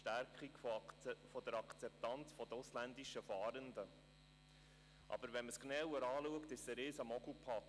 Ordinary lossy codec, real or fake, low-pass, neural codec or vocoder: none; real; none; none